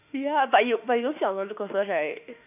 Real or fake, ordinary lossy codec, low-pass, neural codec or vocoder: fake; none; 3.6 kHz; autoencoder, 48 kHz, 32 numbers a frame, DAC-VAE, trained on Japanese speech